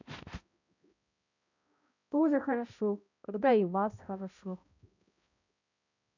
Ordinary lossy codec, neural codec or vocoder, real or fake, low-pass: none; codec, 16 kHz, 0.5 kbps, X-Codec, HuBERT features, trained on balanced general audio; fake; 7.2 kHz